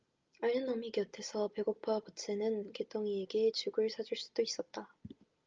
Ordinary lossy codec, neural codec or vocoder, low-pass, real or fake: Opus, 24 kbps; none; 7.2 kHz; real